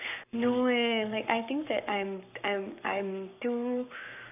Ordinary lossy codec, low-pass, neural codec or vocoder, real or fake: none; 3.6 kHz; codec, 44.1 kHz, 7.8 kbps, Pupu-Codec; fake